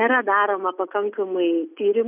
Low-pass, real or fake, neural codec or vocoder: 3.6 kHz; real; none